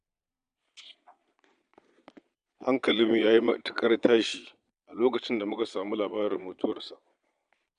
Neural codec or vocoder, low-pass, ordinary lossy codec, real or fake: vocoder, 22.05 kHz, 80 mel bands, Vocos; 9.9 kHz; none; fake